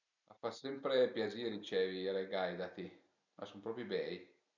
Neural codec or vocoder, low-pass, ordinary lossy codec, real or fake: none; 7.2 kHz; none; real